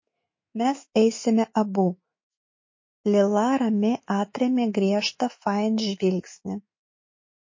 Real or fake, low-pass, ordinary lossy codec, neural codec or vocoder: fake; 7.2 kHz; MP3, 32 kbps; autoencoder, 48 kHz, 128 numbers a frame, DAC-VAE, trained on Japanese speech